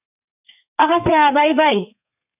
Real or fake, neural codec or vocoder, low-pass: fake; codec, 32 kHz, 1.9 kbps, SNAC; 3.6 kHz